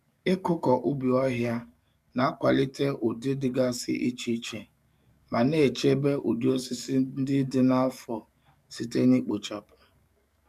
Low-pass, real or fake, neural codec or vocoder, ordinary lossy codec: 14.4 kHz; fake; codec, 44.1 kHz, 7.8 kbps, DAC; MP3, 96 kbps